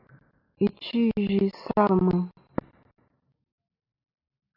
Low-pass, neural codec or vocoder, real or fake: 5.4 kHz; none; real